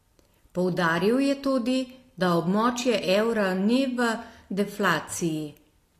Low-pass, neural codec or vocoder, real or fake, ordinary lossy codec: 14.4 kHz; none; real; AAC, 48 kbps